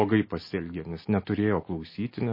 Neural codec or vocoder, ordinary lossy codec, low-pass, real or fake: none; MP3, 24 kbps; 5.4 kHz; real